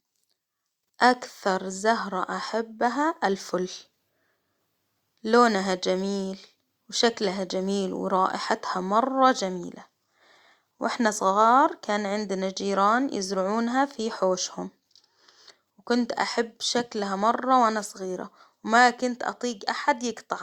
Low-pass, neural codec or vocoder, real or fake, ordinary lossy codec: 19.8 kHz; none; real; Opus, 64 kbps